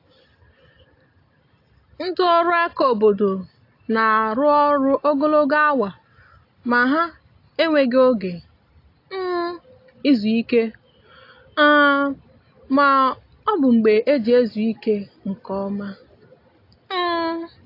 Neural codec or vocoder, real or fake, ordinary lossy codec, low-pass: none; real; AAC, 32 kbps; 5.4 kHz